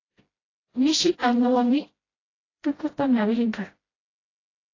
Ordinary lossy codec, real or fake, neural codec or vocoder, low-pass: AAC, 32 kbps; fake; codec, 16 kHz, 0.5 kbps, FreqCodec, smaller model; 7.2 kHz